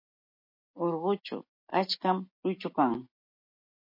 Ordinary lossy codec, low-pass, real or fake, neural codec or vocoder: MP3, 32 kbps; 5.4 kHz; real; none